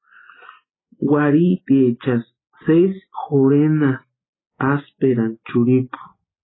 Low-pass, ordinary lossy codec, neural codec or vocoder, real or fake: 7.2 kHz; AAC, 16 kbps; none; real